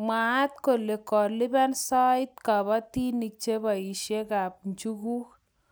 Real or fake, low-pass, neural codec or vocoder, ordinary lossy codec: real; none; none; none